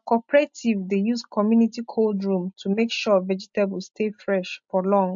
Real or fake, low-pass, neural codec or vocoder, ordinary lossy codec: real; 7.2 kHz; none; MP3, 48 kbps